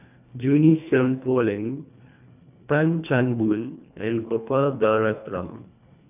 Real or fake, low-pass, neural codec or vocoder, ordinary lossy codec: fake; 3.6 kHz; codec, 24 kHz, 1.5 kbps, HILCodec; none